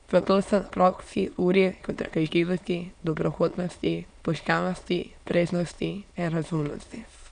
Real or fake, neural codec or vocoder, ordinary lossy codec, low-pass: fake; autoencoder, 22.05 kHz, a latent of 192 numbers a frame, VITS, trained on many speakers; none; 9.9 kHz